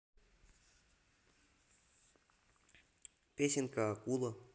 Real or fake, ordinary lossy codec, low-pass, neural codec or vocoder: real; none; none; none